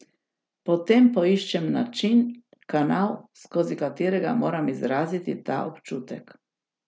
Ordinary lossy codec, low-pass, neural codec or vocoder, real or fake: none; none; none; real